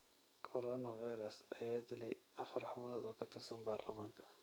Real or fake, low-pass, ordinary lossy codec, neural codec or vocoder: fake; none; none; codec, 44.1 kHz, 2.6 kbps, SNAC